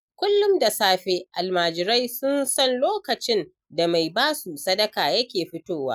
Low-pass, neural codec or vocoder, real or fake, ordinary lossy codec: none; none; real; none